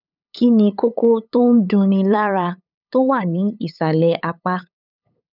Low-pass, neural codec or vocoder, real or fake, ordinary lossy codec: 5.4 kHz; codec, 16 kHz, 8 kbps, FunCodec, trained on LibriTTS, 25 frames a second; fake; none